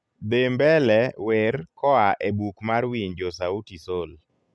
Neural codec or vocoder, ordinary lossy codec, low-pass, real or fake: none; none; none; real